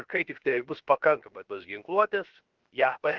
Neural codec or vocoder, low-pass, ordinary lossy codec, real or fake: codec, 16 kHz, 0.7 kbps, FocalCodec; 7.2 kHz; Opus, 16 kbps; fake